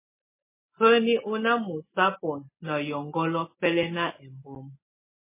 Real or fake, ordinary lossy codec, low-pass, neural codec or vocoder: real; MP3, 16 kbps; 3.6 kHz; none